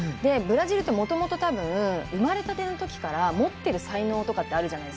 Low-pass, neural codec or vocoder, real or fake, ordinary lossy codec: none; none; real; none